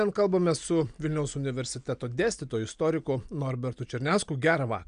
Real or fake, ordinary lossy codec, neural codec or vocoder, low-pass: real; AAC, 96 kbps; none; 9.9 kHz